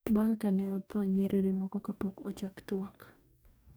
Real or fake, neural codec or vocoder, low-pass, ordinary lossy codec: fake; codec, 44.1 kHz, 2.6 kbps, DAC; none; none